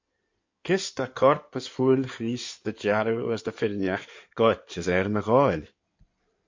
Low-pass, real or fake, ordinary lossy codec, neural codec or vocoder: 7.2 kHz; fake; MP3, 48 kbps; codec, 16 kHz in and 24 kHz out, 2.2 kbps, FireRedTTS-2 codec